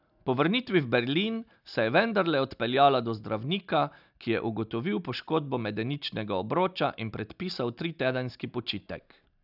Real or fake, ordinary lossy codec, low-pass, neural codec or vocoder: real; none; 5.4 kHz; none